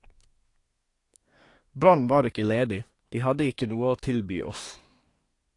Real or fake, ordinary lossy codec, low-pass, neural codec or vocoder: fake; AAC, 48 kbps; 10.8 kHz; codec, 24 kHz, 1 kbps, SNAC